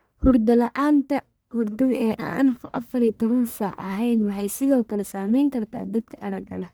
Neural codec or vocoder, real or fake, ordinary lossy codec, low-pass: codec, 44.1 kHz, 1.7 kbps, Pupu-Codec; fake; none; none